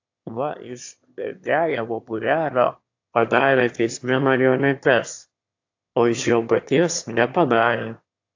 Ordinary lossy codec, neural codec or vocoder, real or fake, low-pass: AAC, 48 kbps; autoencoder, 22.05 kHz, a latent of 192 numbers a frame, VITS, trained on one speaker; fake; 7.2 kHz